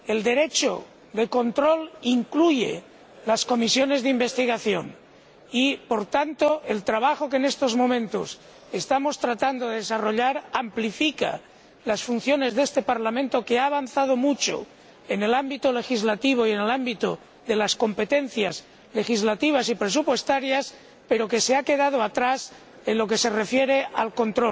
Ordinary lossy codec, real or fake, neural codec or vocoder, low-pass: none; real; none; none